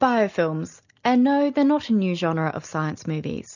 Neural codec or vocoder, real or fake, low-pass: none; real; 7.2 kHz